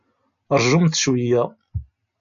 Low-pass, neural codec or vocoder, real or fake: 7.2 kHz; none; real